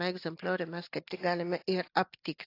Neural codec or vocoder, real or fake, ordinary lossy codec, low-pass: none; real; AAC, 32 kbps; 5.4 kHz